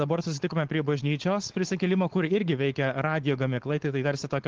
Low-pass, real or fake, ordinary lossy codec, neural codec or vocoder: 7.2 kHz; fake; Opus, 16 kbps; codec, 16 kHz, 4.8 kbps, FACodec